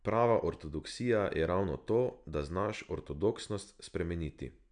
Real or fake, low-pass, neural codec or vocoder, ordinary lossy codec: real; 9.9 kHz; none; none